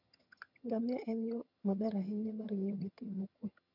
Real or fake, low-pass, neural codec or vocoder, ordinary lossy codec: fake; 5.4 kHz; vocoder, 22.05 kHz, 80 mel bands, HiFi-GAN; none